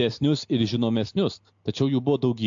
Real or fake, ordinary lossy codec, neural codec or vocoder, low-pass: real; AAC, 64 kbps; none; 7.2 kHz